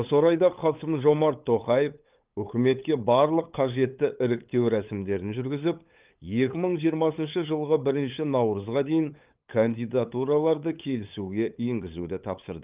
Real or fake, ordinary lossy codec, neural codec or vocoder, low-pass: fake; Opus, 32 kbps; codec, 16 kHz, 8 kbps, FunCodec, trained on LibriTTS, 25 frames a second; 3.6 kHz